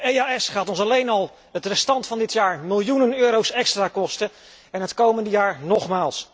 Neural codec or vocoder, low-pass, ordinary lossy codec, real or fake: none; none; none; real